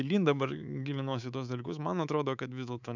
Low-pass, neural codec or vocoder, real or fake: 7.2 kHz; codec, 24 kHz, 3.1 kbps, DualCodec; fake